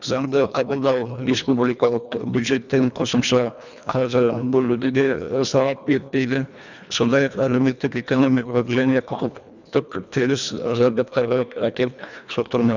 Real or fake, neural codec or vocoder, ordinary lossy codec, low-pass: fake; codec, 24 kHz, 1.5 kbps, HILCodec; none; 7.2 kHz